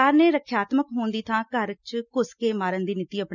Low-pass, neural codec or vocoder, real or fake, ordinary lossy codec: 7.2 kHz; none; real; none